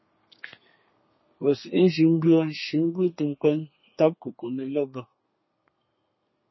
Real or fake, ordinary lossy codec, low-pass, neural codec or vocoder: fake; MP3, 24 kbps; 7.2 kHz; codec, 32 kHz, 1.9 kbps, SNAC